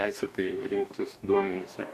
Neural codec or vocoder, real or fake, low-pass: codec, 44.1 kHz, 2.6 kbps, DAC; fake; 14.4 kHz